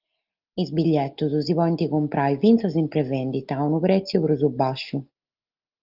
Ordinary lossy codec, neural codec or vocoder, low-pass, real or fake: Opus, 24 kbps; none; 5.4 kHz; real